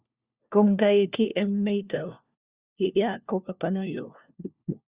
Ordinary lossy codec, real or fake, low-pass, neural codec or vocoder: Opus, 64 kbps; fake; 3.6 kHz; codec, 16 kHz, 1 kbps, FunCodec, trained on LibriTTS, 50 frames a second